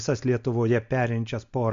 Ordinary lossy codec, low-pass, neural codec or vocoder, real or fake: MP3, 64 kbps; 7.2 kHz; none; real